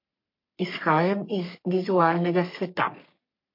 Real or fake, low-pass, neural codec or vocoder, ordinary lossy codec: fake; 5.4 kHz; codec, 44.1 kHz, 3.4 kbps, Pupu-Codec; MP3, 32 kbps